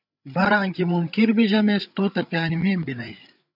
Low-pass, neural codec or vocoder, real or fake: 5.4 kHz; codec, 16 kHz, 8 kbps, FreqCodec, larger model; fake